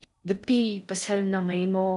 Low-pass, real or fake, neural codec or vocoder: 10.8 kHz; fake; codec, 16 kHz in and 24 kHz out, 0.6 kbps, FocalCodec, streaming, 4096 codes